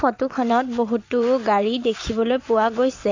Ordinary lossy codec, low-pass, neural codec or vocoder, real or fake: AAC, 48 kbps; 7.2 kHz; vocoder, 22.05 kHz, 80 mel bands, WaveNeXt; fake